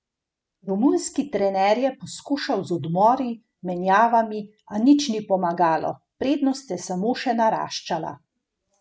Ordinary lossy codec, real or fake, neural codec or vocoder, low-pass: none; real; none; none